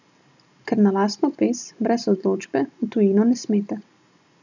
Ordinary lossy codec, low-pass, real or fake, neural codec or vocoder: none; 7.2 kHz; real; none